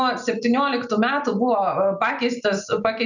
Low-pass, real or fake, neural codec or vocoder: 7.2 kHz; real; none